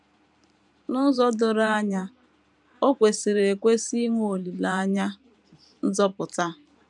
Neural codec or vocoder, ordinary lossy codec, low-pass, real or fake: vocoder, 44.1 kHz, 128 mel bands every 512 samples, BigVGAN v2; none; 10.8 kHz; fake